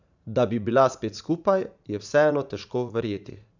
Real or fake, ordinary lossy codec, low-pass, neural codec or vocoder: fake; none; 7.2 kHz; vocoder, 44.1 kHz, 80 mel bands, Vocos